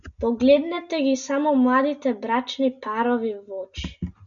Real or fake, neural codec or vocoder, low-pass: real; none; 7.2 kHz